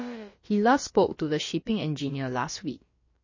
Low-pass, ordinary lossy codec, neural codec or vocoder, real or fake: 7.2 kHz; MP3, 32 kbps; codec, 16 kHz, about 1 kbps, DyCAST, with the encoder's durations; fake